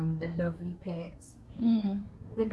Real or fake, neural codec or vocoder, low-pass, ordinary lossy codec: fake; codec, 24 kHz, 1 kbps, SNAC; none; none